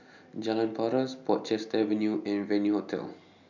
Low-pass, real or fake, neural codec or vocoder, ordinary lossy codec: 7.2 kHz; real; none; none